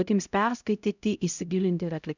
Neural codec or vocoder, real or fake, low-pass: codec, 16 kHz, 0.5 kbps, X-Codec, HuBERT features, trained on LibriSpeech; fake; 7.2 kHz